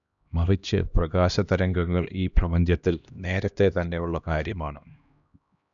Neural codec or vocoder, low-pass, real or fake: codec, 16 kHz, 1 kbps, X-Codec, HuBERT features, trained on LibriSpeech; 7.2 kHz; fake